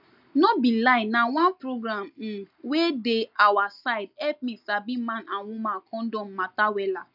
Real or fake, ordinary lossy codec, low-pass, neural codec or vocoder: real; none; 5.4 kHz; none